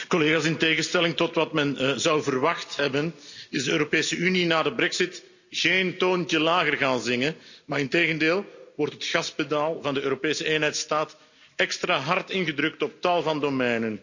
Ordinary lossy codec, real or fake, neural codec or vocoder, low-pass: none; real; none; 7.2 kHz